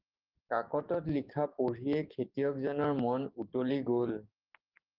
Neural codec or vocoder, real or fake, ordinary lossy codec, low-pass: codec, 16 kHz, 6 kbps, DAC; fake; Opus, 32 kbps; 5.4 kHz